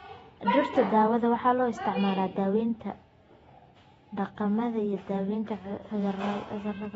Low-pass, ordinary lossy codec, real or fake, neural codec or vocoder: 19.8 kHz; AAC, 24 kbps; fake; vocoder, 48 kHz, 128 mel bands, Vocos